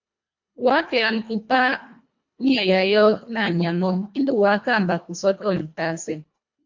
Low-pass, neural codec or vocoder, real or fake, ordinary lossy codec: 7.2 kHz; codec, 24 kHz, 1.5 kbps, HILCodec; fake; MP3, 48 kbps